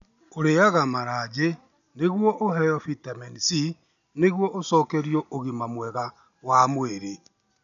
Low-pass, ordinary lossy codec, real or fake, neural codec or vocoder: 7.2 kHz; none; real; none